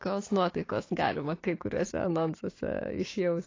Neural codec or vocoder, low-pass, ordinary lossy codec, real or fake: codec, 16 kHz, 6 kbps, DAC; 7.2 kHz; AAC, 32 kbps; fake